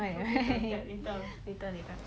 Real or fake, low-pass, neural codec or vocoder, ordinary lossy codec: real; none; none; none